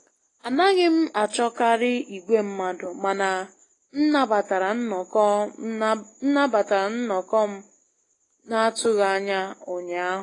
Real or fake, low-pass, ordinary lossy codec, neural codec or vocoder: real; 10.8 kHz; AAC, 32 kbps; none